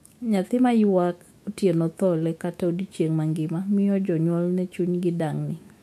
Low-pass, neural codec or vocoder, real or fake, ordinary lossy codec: 14.4 kHz; autoencoder, 48 kHz, 128 numbers a frame, DAC-VAE, trained on Japanese speech; fake; MP3, 64 kbps